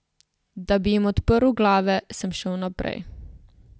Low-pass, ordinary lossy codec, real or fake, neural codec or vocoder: none; none; real; none